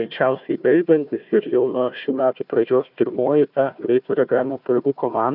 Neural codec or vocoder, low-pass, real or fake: codec, 16 kHz, 1 kbps, FunCodec, trained on Chinese and English, 50 frames a second; 5.4 kHz; fake